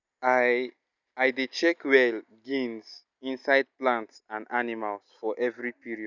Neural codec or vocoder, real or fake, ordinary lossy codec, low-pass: none; real; none; 7.2 kHz